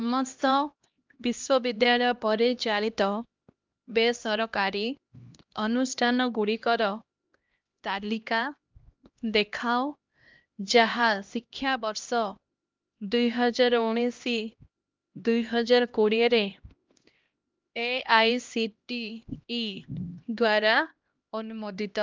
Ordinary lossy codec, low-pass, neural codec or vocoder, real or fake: Opus, 24 kbps; 7.2 kHz; codec, 16 kHz, 1 kbps, X-Codec, HuBERT features, trained on LibriSpeech; fake